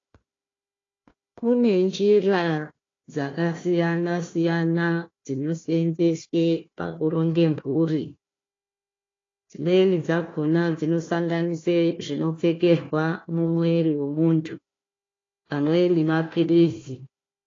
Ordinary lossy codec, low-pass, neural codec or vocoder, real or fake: AAC, 32 kbps; 7.2 kHz; codec, 16 kHz, 1 kbps, FunCodec, trained on Chinese and English, 50 frames a second; fake